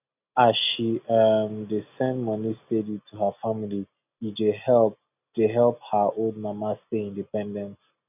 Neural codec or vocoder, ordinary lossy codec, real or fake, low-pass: none; none; real; 3.6 kHz